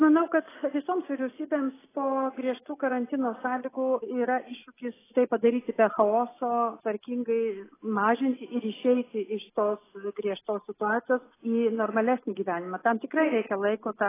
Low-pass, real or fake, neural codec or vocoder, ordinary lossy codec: 3.6 kHz; real; none; AAC, 16 kbps